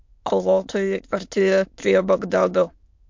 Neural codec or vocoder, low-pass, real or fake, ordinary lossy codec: autoencoder, 22.05 kHz, a latent of 192 numbers a frame, VITS, trained on many speakers; 7.2 kHz; fake; MP3, 48 kbps